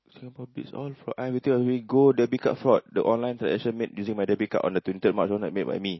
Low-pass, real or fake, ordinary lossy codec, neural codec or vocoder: 7.2 kHz; real; MP3, 24 kbps; none